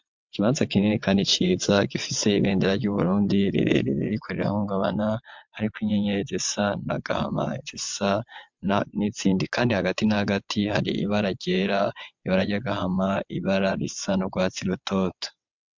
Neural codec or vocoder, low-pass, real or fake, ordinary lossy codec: vocoder, 22.05 kHz, 80 mel bands, WaveNeXt; 7.2 kHz; fake; MP3, 64 kbps